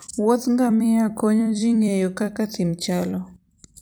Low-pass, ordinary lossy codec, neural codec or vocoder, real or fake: none; none; vocoder, 44.1 kHz, 128 mel bands every 256 samples, BigVGAN v2; fake